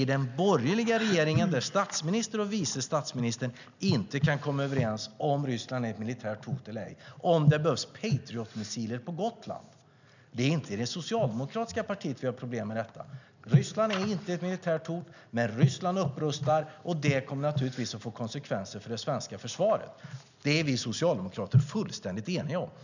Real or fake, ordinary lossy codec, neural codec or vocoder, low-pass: real; none; none; 7.2 kHz